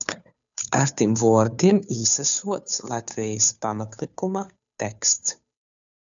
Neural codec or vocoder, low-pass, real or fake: codec, 16 kHz, 2 kbps, FunCodec, trained on Chinese and English, 25 frames a second; 7.2 kHz; fake